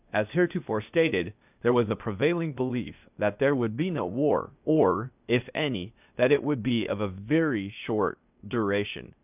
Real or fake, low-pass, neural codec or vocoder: fake; 3.6 kHz; codec, 16 kHz, 0.8 kbps, ZipCodec